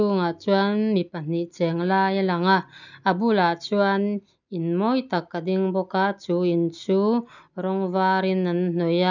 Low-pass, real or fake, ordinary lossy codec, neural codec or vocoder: 7.2 kHz; real; none; none